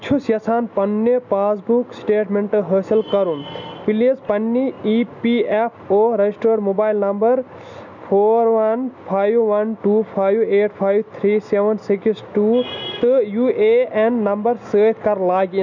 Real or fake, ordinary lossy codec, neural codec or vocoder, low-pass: real; none; none; 7.2 kHz